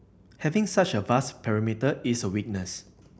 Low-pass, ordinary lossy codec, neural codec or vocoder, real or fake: none; none; none; real